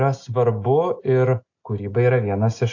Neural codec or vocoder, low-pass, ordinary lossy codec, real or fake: none; 7.2 kHz; AAC, 48 kbps; real